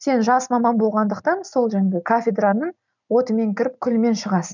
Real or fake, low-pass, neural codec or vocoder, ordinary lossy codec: real; 7.2 kHz; none; none